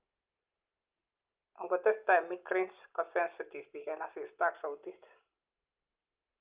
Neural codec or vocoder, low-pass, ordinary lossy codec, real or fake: none; 3.6 kHz; Opus, 24 kbps; real